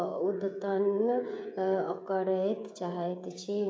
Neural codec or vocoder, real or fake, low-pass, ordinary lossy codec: codec, 16 kHz, 16 kbps, FreqCodec, smaller model; fake; none; none